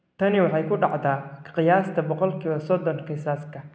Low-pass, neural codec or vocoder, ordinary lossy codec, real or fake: none; none; none; real